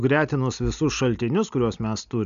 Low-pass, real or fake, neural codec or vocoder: 7.2 kHz; real; none